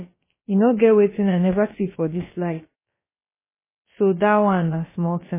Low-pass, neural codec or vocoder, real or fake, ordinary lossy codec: 3.6 kHz; codec, 16 kHz, about 1 kbps, DyCAST, with the encoder's durations; fake; MP3, 16 kbps